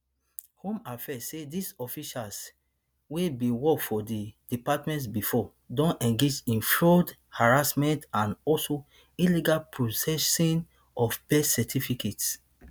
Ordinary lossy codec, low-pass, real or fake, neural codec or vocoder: none; none; real; none